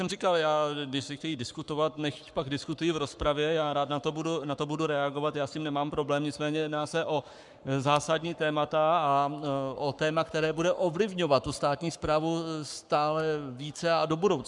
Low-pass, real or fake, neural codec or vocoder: 10.8 kHz; fake; codec, 44.1 kHz, 7.8 kbps, Pupu-Codec